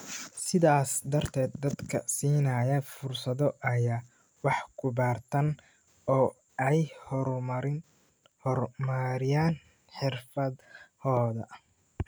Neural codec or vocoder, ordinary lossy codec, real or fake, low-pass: none; none; real; none